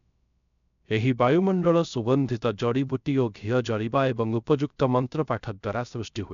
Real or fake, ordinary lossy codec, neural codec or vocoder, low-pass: fake; none; codec, 16 kHz, 0.3 kbps, FocalCodec; 7.2 kHz